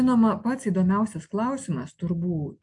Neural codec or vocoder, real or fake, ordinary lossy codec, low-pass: none; real; AAC, 64 kbps; 10.8 kHz